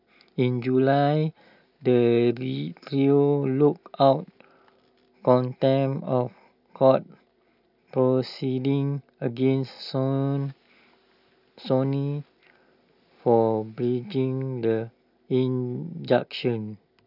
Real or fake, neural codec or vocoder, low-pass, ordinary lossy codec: real; none; 5.4 kHz; none